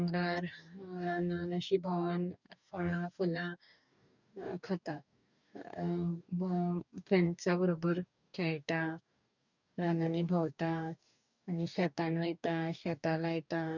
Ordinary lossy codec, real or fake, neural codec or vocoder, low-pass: none; fake; codec, 44.1 kHz, 2.6 kbps, DAC; 7.2 kHz